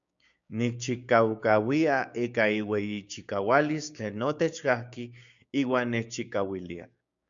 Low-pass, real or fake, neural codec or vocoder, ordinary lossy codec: 7.2 kHz; fake; codec, 16 kHz, 6 kbps, DAC; MP3, 96 kbps